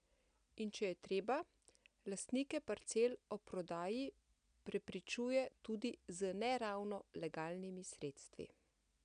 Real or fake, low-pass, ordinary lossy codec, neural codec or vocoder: real; 9.9 kHz; none; none